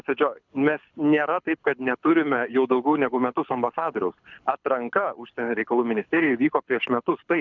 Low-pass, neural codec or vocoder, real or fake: 7.2 kHz; codec, 24 kHz, 6 kbps, HILCodec; fake